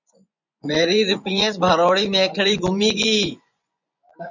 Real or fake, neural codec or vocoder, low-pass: fake; vocoder, 24 kHz, 100 mel bands, Vocos; 7.2 kHz